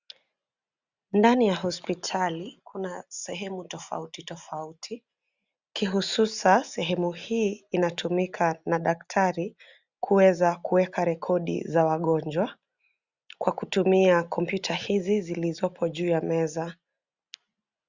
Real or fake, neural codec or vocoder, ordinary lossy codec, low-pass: real; none; Opus, 64 kbps; 7.2 kHz